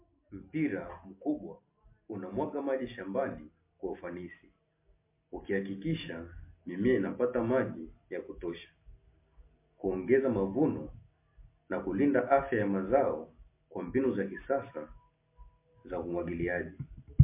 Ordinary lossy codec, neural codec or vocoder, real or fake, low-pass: MP3, 24 kbps; none; real; 3.6 kHz